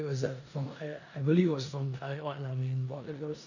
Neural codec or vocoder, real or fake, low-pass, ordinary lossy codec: codec, 16 kHz in and 24 kHz out, 0.9 kbps, LongCat-Audio-Codec, four codebook decoder; fake; 7.2 kHz; none